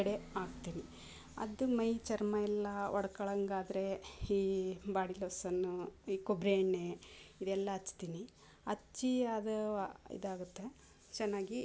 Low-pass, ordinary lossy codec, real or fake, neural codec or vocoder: none; none; real; none